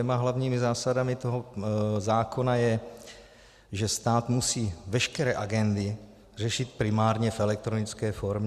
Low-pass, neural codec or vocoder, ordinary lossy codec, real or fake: 14.4 kHz; none; Opus, 64 kbps; real